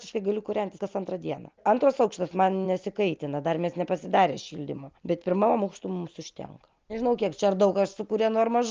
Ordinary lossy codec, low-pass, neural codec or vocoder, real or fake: Opus, 24 kbps; 7.2 kHz; none; real